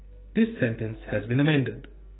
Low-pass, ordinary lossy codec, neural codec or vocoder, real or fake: 7.2 kHz; AAC, 16 kbps; codec, 44.1 kHz, 2.6 kbps, SNAC; fake